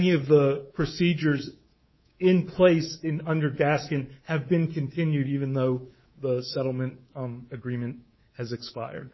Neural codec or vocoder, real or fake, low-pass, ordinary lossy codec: codec, 24 kHz, 3.1 kbps, DualCodec; fake; 7.2 kHz; MP3, 24 kbps